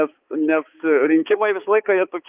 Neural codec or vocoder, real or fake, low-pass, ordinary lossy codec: codec, 16 kHz, 4 kbps, X-Codec, HuBERT features, trained on balanced general audio; fake; 3.6 kHz; Opus, 24 kbps